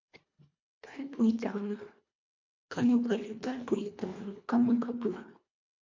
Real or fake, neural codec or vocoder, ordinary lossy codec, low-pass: fake; codec, 24 kHz, 1.5 kbps, HILCodec; MP3, 48 kbps; 7.2 kHz